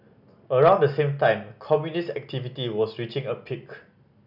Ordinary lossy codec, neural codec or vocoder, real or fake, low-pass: none; none; real; 5.4 kHz